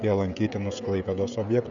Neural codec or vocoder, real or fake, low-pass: codec, 16 kHz, 16 kbps, FreqCodec, smaller model; fake; 7.2 kHz